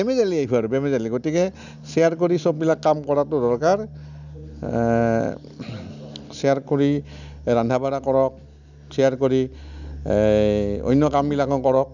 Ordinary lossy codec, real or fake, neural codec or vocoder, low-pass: none; real; none; 7.2 kHz